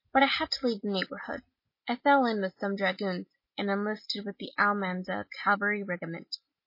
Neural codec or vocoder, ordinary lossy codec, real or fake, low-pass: none; MP3, 24 kbps; real; 5.4 kHz